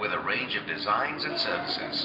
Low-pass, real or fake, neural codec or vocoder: 5.4 kHz; real; none